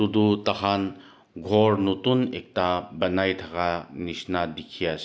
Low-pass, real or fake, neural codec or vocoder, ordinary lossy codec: none; real; none; none